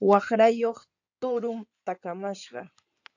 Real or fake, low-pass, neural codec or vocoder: fake; 7.2 kHz; codec, 16 kHz in and 24 kHz out, 2.2 kbps, FireRedTTS-2 codec